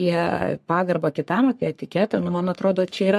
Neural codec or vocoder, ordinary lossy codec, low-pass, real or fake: codec, 44.1 kHz, 3.4 kbps, Pupu-Codec; MP3, 64 kbps; 14.4 kHz; fake